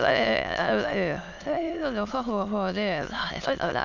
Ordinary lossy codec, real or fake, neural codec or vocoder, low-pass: none; fake; autoencoder, 22.05 kHz, a latent of 192 numbers a frame, VITS, trained on many speakers; 7.2 kHz